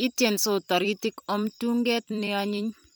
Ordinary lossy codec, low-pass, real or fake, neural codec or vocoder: none; none; fake; vocoder, 44.1 kHz, 128 mel bands every 256 samples, BigVGAN v2